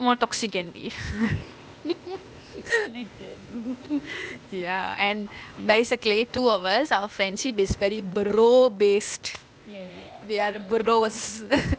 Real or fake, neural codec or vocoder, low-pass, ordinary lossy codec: fake; codec, 16 kHz, 0.8 kbps, ZipCodec; none; none